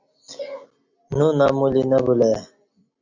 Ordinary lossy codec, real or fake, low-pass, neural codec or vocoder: MP3, 64 kbps; real; 7.2 kHz; none